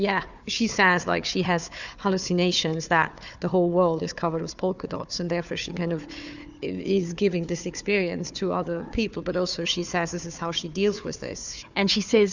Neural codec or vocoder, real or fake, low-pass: codec, 16 kHz, 4 kbps, FunCodec, trained on Chinese and English, 50 frames a second; fake; 7.2 kHz